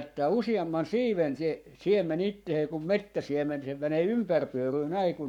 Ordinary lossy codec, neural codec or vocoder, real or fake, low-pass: none; codec, 44.1 kHz, 7.8 kbps, Pupu-Codec; fake; 19.8 kHz